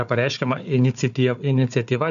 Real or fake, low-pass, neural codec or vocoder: fake; 7.2 kHz; codec, 16 kHz, 4 kbps, FunCodec, trained on Chinese and English, 50 frames a second